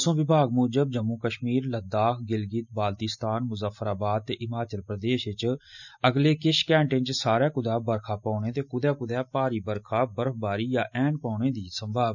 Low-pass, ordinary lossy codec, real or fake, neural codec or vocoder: 7.2 kHz; none; real; none